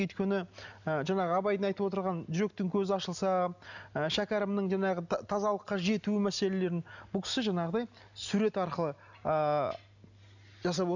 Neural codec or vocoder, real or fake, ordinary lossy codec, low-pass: none; real; none; 7.2 kHz